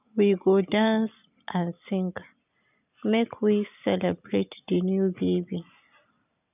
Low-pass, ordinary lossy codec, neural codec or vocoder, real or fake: 3.6 kHz; none; none; real